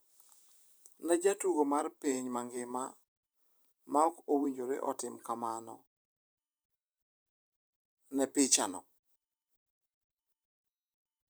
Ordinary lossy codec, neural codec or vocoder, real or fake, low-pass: none; vocoder, 44.1 kHz, 128 mel bands every 512 samples, BigVGAN v2; fake; none